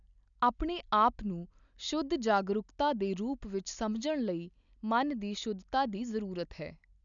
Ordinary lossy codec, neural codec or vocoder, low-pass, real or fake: none; none; 7.2 kHz; real